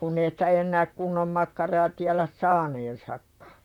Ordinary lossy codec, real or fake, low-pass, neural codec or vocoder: none; fake; 19.8 kHz; vocoder, 44.1 kHz, 128 mel bands, Pupu-Vocoder